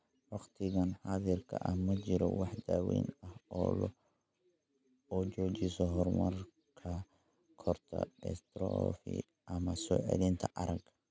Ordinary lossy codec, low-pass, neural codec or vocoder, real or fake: none; none; none; real